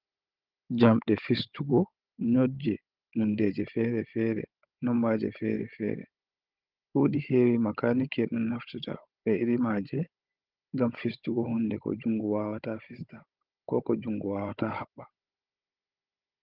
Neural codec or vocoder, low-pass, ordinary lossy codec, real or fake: codec, 16 kHz, 16 kbps, FunCodec, trained on Chinese and English, 50 frames a second; 5.4 kHz; Opus, 24 kbps; fake